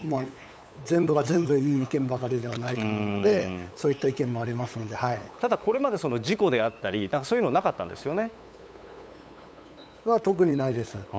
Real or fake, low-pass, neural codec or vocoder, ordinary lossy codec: fake; none; codec, 16 kHz, 8 kbps, FunCodec, trained on LibriTTS, 25 frames a second; none